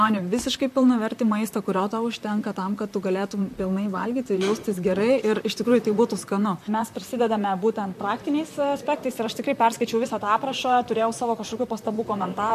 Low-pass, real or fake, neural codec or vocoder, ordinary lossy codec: 14.4 kHz; fake; vocoder, 44.1 kHz, 128 mel bands, Pupu-Vocoder; MP3, 64 kbps